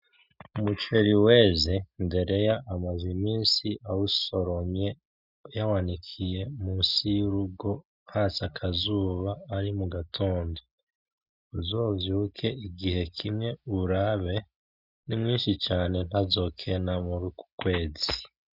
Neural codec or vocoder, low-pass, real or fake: none; 5.4 kHz; real